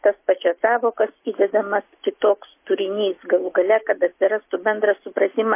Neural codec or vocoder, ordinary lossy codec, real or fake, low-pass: none; MP3, 24 kbps; real; 3.6 kHz